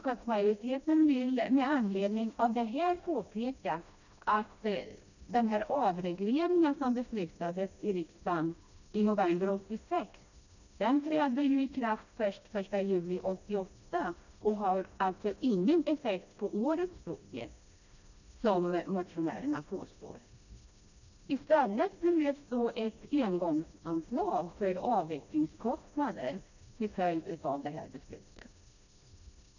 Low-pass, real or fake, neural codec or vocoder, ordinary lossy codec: 7.2 kHz; fake; codec, 16 kHz, 1 kbps, FreqCodec, smaller model; none